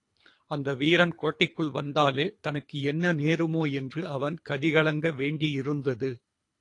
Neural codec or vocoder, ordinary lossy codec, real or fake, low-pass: codec, 24 kHz, 3 kbps, HILCodec; AAC, 48 kbps; fake; 10.8 kHz